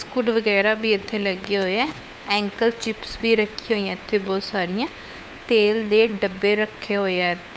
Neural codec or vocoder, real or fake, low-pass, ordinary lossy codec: codec, 16 kHz, 4 kbps, FunCodec, trained on LibriTTS, 50 frames a second; fake; none; none